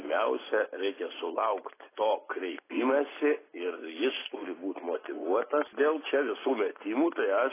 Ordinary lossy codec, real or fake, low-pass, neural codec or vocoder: AAC, 16 kbps; real; 3.6 kHz; none